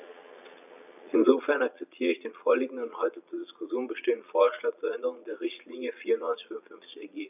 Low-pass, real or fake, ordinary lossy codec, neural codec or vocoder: 3.6 kHz; fake; none; vocoder, 22.05 kHz, 80 mel bands, Vocos